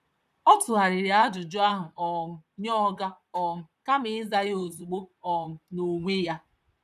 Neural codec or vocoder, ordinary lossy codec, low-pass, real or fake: vocoder, 44.1 kHz, 128 mel bands, Pupu-Vocoder; none; 14.4 kHz; fake